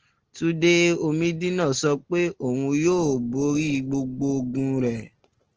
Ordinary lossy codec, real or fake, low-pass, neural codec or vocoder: Opus, 16 kbps; real; 7.2 kHz; none